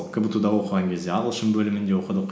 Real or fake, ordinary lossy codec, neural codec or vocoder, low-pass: real; none; none; none